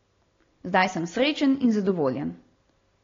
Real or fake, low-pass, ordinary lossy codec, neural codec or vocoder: real; 7.2 kHz; AAC, 32 kbps; none